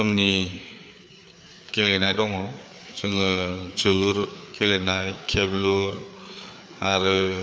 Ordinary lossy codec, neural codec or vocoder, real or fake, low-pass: none; codec, 16 kHz, 4 kbps, FreqCodec, larger model; fake; none